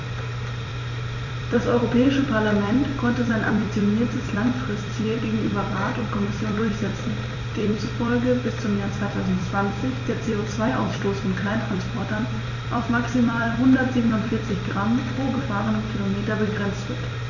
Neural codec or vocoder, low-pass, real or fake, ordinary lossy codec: vocoder, 44.1 kHz, 128 mel bands every 256 samples, BigVGAN v2; 7.2 kHz; fake; none